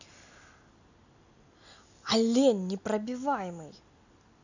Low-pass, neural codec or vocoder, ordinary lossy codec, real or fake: 7.2 kHz; none; none; real